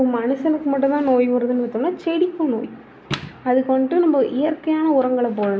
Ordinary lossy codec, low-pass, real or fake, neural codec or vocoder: none; none; real; none